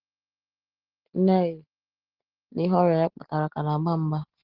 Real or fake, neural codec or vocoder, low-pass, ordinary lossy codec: real; none; 5.4 kHz; Opus, 16 kbps